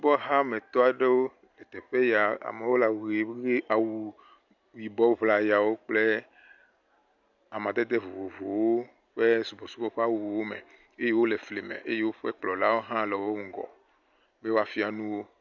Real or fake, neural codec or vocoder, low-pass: real; none; 7.2 kHz